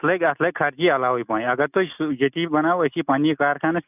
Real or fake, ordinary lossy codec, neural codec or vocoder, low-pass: real; none; none; 3.6 kHz